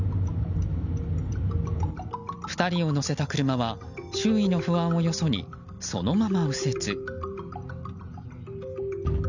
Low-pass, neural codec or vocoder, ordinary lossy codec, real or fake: 7.2 kHz; none; none; real